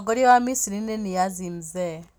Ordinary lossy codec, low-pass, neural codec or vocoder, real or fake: none; none; none; real